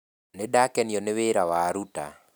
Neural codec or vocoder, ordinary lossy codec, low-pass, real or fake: none; none; none; real